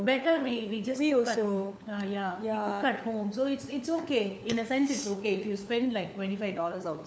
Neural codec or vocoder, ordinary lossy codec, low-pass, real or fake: codec, 16 kHz, 4 kbps, FunCodec, trained on LibriTTS, 50 frames a second; none; none; fake